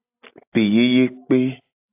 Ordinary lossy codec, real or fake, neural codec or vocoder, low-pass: AAC, 32 kbps; real; none; 3.6 kHz